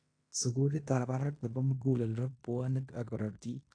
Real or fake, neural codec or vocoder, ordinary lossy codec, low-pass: fake; codec, 16 kHz in and 24 kHz out, 0.9 kbps, LongCat-Audio-Codec, fine tuned four codebook decoder; AAC, 48 kbps; 9.9 kHz